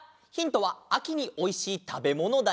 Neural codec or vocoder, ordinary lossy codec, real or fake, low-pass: none; none; real; none